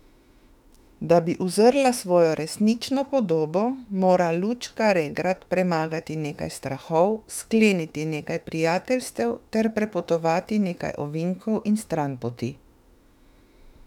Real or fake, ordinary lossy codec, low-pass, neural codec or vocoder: fake; none; 19.8 kHz; autoencoder, 48 kHz, 32 numbers a frame, DAC-VAE, trained on Japanese speech